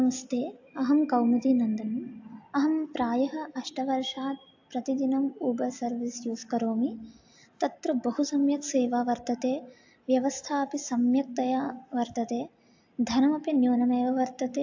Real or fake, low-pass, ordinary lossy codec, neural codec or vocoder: real; 7.2 kHz; AAC, 48 kbps; none